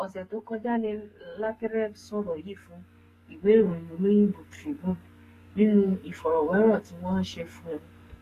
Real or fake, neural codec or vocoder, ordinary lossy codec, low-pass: fake; codec, 44.1 kHz, 2.6 kbps, SNAC; AAC, 48 kbps; 14.4 kHz